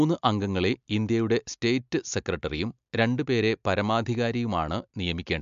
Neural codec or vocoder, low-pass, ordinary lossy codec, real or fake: none; 7.2 kHz; MP3, 64 kbps; real